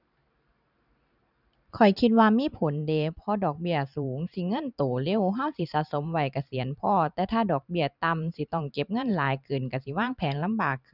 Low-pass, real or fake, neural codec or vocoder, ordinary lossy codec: 5.4 kHz; fake; vocoder, 44.1 kHz, 128 mel bands every 512 samples, BigVGAN v2; none